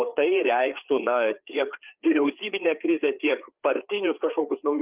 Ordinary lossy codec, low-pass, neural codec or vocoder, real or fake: Opus, 24 kbps; 3.6 kHz; codec, 16 kHz, 4 kbps, FreqCodec, larger model; fake